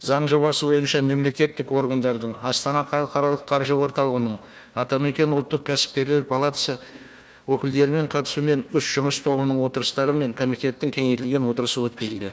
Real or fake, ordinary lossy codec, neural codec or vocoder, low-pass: fake; none; codec, 16 kHz, 1 kbps, FunCodec, trained on Chinese and English, 50 frames a second; none